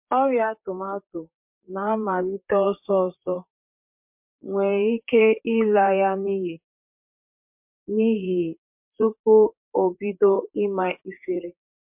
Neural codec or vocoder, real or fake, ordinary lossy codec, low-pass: vocoder, 44.1 kHz, 128 mel bands, Pupu-Vocoder; fake; MP3, 32 kbps; 3.6 kHz